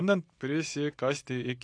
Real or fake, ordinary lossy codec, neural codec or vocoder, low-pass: real; AAC, 48 kbps; none; 9.9 kHz